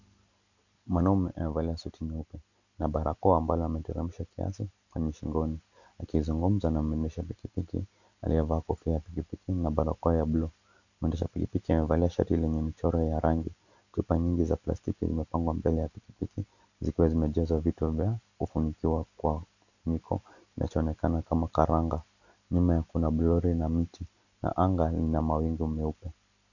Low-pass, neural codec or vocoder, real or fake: 7.2 kHz; none; real